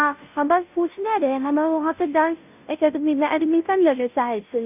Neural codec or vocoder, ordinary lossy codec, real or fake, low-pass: codec, 16 kHz, 0.5 kbps, FunCodec, trained on Chinese and English, 25 frames a second; none; fake; 3.6 kHz